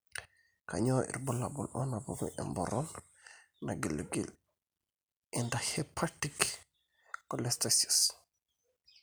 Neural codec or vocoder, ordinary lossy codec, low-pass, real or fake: none; none; none; real